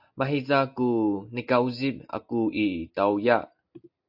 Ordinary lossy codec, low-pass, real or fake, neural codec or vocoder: AAC, 48 kbps; 5.4 kHz; real; none